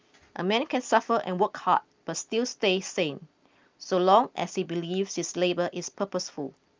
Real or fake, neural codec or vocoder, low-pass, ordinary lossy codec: real; none; 7.2 kHz; Opus, 24 kbps